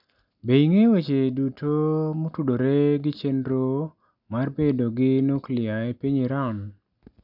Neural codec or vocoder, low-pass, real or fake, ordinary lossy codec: none; 5.4 kHz; real; none